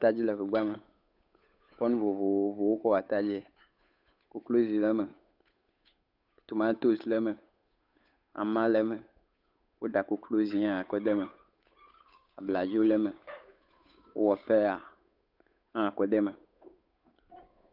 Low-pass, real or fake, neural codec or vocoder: 5.4 kHz; fake; codec, 16 kHz, 8 kbps, FunCodec, trained on Chinese and English, 25 frames a second